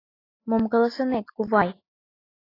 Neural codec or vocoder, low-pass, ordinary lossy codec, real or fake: none; 5.4 kHz; AAC, 24 kbps; real